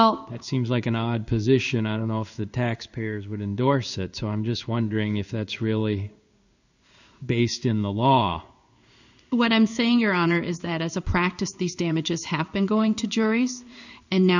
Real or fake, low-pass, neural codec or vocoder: fake; 7.2 kHz; codec, 16 kHz in and 24 kHz out, 1 kbps, XY-Tokenizer